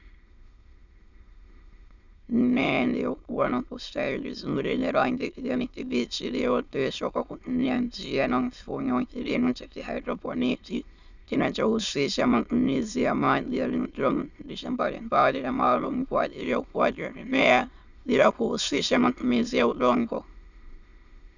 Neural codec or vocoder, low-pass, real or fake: autoencoder, 22.05 kHz, a latent of 192 numbers a frame, VITS, trained on many speakers; 7.2 kHz; fake